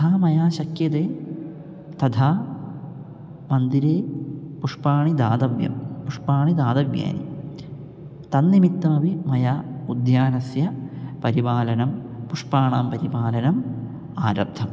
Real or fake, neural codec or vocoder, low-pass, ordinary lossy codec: real; none; none; none